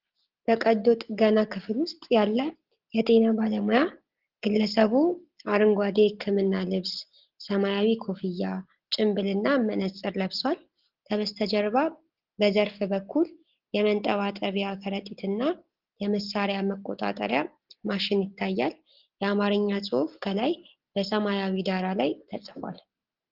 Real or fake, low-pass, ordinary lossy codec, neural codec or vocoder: real; 5.4 kHz; Opus, 16 kbps; none